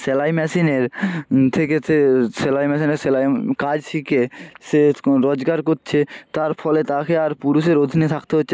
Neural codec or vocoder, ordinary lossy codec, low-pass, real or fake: none; none; none; real